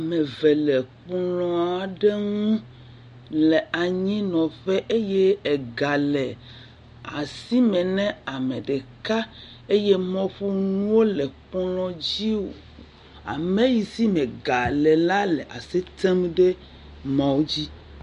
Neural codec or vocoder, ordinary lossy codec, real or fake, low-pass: none; MP3, 48 kbps; real; 14.4 kHz